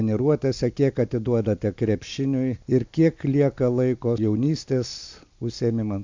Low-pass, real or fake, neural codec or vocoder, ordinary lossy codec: 7.2 kHz; real; none; MP3, 64 kbps